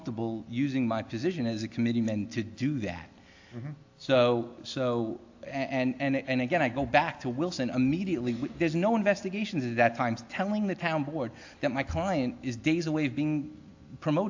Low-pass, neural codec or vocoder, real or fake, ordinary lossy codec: 7.2 kHz; none; real; AAC, 48 kbps